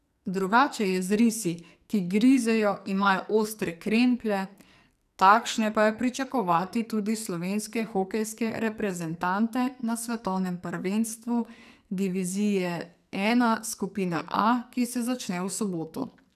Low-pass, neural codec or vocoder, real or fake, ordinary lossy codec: 14.4 kHz; codec, 44.1 kHz, 2.6 kbps, SNAC; fake; none